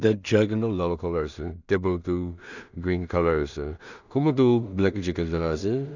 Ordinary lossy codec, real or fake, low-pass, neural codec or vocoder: none; fake; 7.2 kHz; codec, 16 kHz in and 24 kHz out, 0.4 kbps, LongCat-Audio-Codec, two codebook decoder